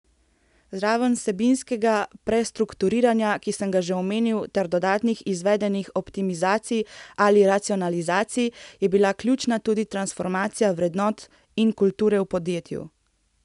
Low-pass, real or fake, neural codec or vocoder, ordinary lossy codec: 10.8 kHz; real; none; none